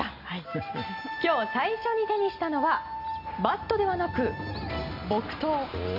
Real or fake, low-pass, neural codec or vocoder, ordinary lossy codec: real; 5.4 kHz; none; none